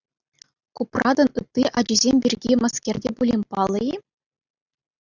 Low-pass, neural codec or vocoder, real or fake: 7.2 kHz; none; real